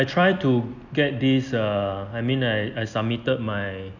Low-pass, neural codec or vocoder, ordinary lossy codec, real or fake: 7.2 kHz; none; none; real